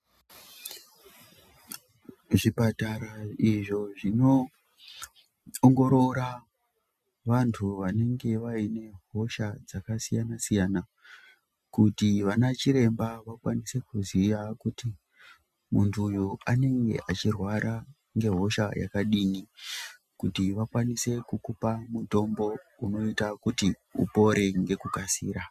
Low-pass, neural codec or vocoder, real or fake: 14.4 kHz; none; real